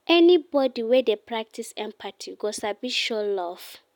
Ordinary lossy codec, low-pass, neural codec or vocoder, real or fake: none; 19.8 kHz; none; real